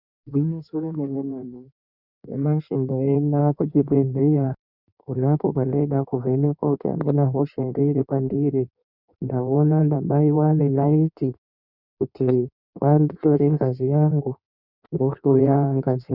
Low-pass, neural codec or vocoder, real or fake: 5.4 kHz; codec, 16 kHz in and 24 kHz out, 1.1 kbps, FireRedTTS-2 codec; fake